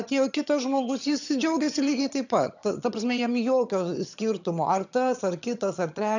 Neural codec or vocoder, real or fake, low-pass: vocoder, 22.05 kHz, 80 mel bands, HiFi-GAN; fake; 7.2 kHz